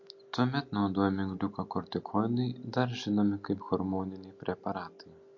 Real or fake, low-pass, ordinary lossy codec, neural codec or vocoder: real; 7.2 kHz; MP3, 48 kbps; none